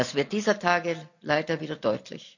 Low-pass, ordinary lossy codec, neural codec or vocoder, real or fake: 7.2 kHz; none; none; real